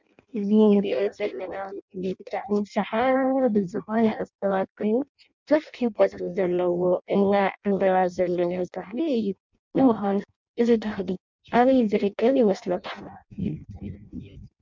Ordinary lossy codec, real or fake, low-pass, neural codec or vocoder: MP3, 64 kbps; fake; 7.2 kHz; codec, 16 kHz in and 24 kHz out, 0.6 kbps, FireRedTTS-2 codec